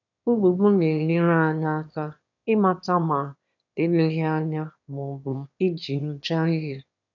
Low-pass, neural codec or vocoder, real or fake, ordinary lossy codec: 7.2 kHz; autoencoder, 22.05 kHz, a latent of 192 numbers a frame, VITS, trained on one speaker; fake; none